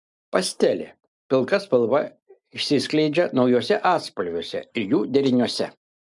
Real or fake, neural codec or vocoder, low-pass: real; none; 10.8 kHz